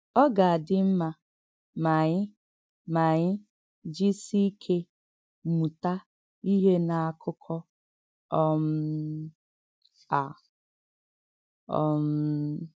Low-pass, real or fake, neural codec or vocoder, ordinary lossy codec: none; real; none; none